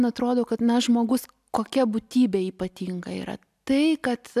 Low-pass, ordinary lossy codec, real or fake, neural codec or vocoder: 14.4 kHz; AAC, 96 kbps; real; none